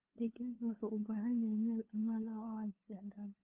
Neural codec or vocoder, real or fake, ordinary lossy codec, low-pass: codec, 16 kHz, 2 kbps, FunCodec, trained on LibriTTS, 25 frames a second; fake; Opus, 32 kbps; 3.6 kHz